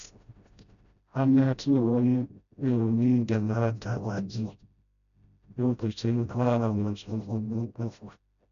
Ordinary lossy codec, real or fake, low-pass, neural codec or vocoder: none; fake; 7.2 kHz; codec, 16 kHz, 0.5 kbps, FreqCodec, smaller model